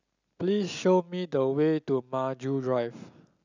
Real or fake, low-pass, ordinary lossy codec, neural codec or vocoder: real; 7.2 kHz; none; none